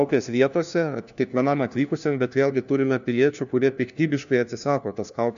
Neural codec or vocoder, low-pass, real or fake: codec, 16 kHz, 1 kbps, FunCodec, trained on LibriTTS, 50 frames a second; 7.2 kHz; fake